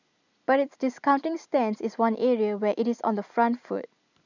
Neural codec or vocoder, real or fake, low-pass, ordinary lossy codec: none; real; 7.2 kHz; none